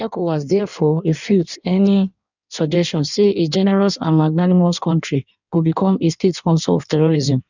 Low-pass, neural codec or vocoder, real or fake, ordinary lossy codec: 7.2 kHz; codec, 16 kHz in and 24 kHz out, 1.1 kbps, FireRedTTS-2 codec; fake; none